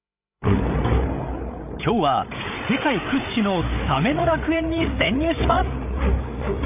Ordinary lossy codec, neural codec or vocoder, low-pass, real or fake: none; codec, 16 kHz, 8 kbps, FreqCodec, larger model; 3.6 kHz; fake